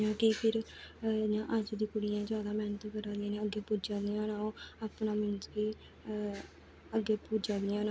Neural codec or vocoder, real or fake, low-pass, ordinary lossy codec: none; real; none; none